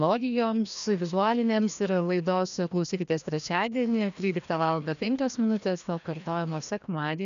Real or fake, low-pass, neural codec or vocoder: fake; 7.2 kHz; codec, 16 kHz, 1 kbps, FreqCodec, larger model